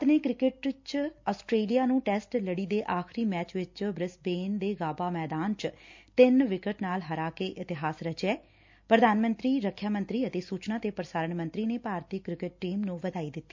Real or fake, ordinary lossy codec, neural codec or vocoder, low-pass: real; MP3, 64 kbps; none; 7.2 kHz